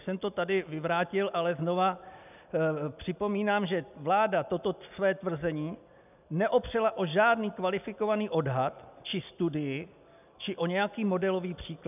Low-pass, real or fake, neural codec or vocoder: 3.6 kHz; real; none